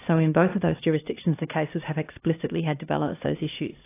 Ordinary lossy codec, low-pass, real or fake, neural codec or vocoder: AAC, 24 kbps; 3.6 kHz; fake; codec, 16 kHz, 1 kbps, X-Codec, HuBERT features, trained on LibriSpeech